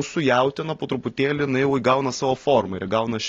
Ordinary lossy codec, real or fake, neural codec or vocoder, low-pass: AAC, 32 kbps; real; none; 7.2 kHz